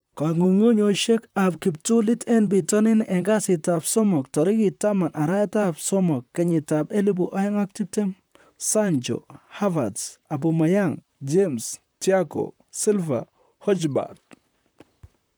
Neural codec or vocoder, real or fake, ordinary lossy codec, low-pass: vocoder, 44.1 kHz, 128 mel bands, Pupu-Vocoder; fake; none; none